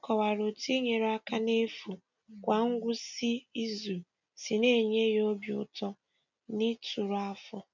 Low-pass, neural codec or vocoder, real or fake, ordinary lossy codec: 7.2 kHz; none; real; none